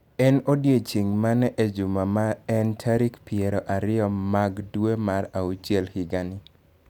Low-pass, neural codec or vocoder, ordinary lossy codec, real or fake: 19.8 kHz; none; none; real